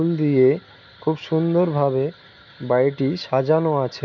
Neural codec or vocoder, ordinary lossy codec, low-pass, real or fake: none; none; 7.2 kHz; real